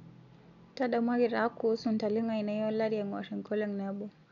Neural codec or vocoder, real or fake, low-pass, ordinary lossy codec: none; real; 7.2 kHz; none